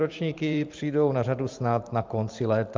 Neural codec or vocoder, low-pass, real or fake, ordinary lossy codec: vocoder, 44.1 kHz, 128 mel bands every 512 samples, BigVGAN v2; 7.2 kHz; fake; Opus, 24 kbps